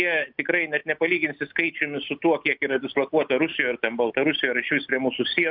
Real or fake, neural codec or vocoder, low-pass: real; none; 5.4 kHz